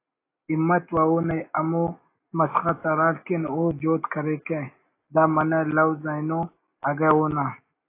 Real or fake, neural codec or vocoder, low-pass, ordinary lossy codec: real; none; 3.6 kHz; AAC, 24 kbps